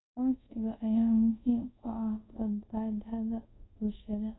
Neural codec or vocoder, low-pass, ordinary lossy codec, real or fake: codec, 24 kHz, 0.5 kbps, DualCodec; 7.2 kHz; AAC, 16 kbps; fake